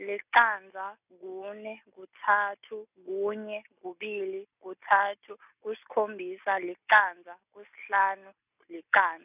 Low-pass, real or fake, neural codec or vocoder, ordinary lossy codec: 3.6 kHz; real; none; none